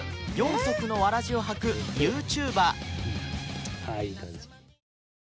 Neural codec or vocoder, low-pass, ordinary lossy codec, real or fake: none; none; none; real